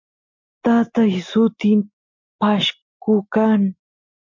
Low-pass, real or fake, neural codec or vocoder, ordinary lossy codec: 7.2 kHz; real; none; MP3, 48 kbps